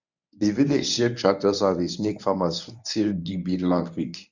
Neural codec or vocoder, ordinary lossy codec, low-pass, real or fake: codec, 24 kHz, 0.9 kbps, WavTokenizer, medium speech release version 1; none; 7.2 kHz; fake